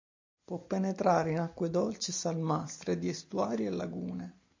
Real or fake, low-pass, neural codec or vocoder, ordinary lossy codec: real; 7.2 kHz; none; MP3, 48 kbps